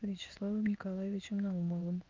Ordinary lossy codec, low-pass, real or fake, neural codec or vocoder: Opus, 24 kbps; 7.2 kHz; fake; codec, 16 kHz in and 24 kHz out, 1 kbps, XY-Tokenizer